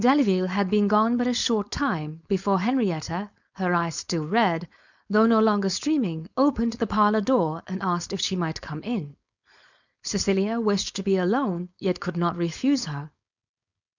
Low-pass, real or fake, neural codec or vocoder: 7.2 kHz; fake; codec, 16 kHz, 4.8 kbps, FACodec